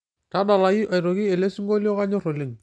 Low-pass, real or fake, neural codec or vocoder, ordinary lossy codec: 9.9 kHz; real; none; none